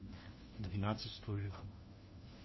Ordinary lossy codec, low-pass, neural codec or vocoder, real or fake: MP3, 24 kbps; 7.2 kHz; codec, 16 kHz, 1 kbps, FunCodec, trained on LibriTTS, 50 frames a second; fake